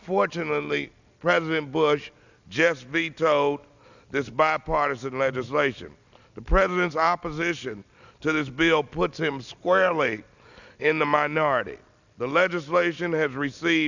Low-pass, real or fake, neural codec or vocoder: 7.2 kHz; real; none